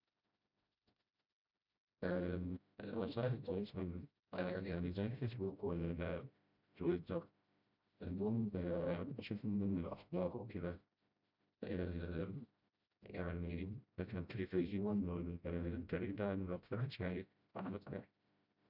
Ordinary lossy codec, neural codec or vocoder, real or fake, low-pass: none; codec, 16 kHz, 0.5 kbps, FreqCodec, smaller model; fake; 5.4 kHz